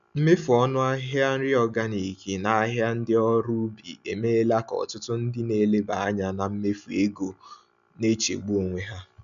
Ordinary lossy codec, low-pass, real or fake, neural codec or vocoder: none; 7.2 kHz; real; none